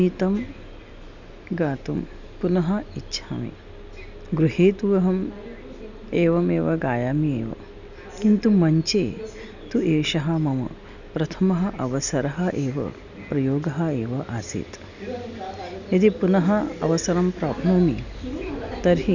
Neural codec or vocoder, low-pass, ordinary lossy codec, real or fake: none; 7.2 kHz; none; real